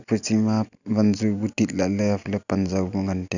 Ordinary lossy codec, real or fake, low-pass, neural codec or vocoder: none; real; 7.2 kHz; none